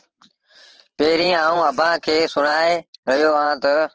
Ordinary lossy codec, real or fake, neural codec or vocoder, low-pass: Opus, 16 kbps; fake; vocoder, 44.1 kHz, 128 mel bands every 512 samples, BigVGAN v2; 7.2 kHz